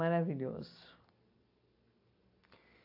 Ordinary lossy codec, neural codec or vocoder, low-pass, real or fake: AAC, 32 kbps; none; 5.4 kHz; real